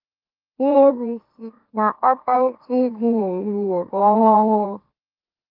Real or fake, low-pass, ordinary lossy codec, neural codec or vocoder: fake; 5.4 kHz; Opus, 32 kbps; autoencoder, 44.1 kHz, a latent of 192 numbers a frame, MeloTTS